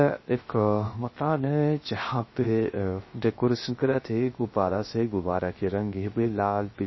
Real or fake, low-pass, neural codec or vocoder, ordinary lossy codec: fake; 7.2 kHz; codec, 16 kHz, 0.3 kbps, FocalCodec; MP3, 24 kbps